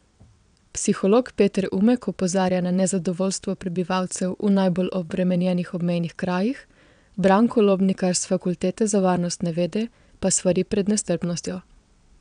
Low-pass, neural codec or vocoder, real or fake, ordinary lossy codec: 9.9 kHz; vocoder, 22.05 kHz, 80 mel bands, WaveNeXt; fake; none